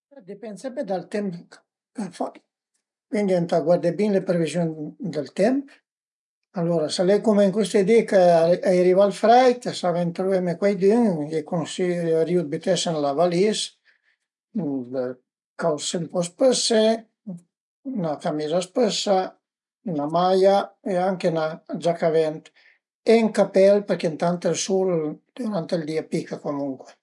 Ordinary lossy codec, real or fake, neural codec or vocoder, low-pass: none; real; none; 10.8 kHz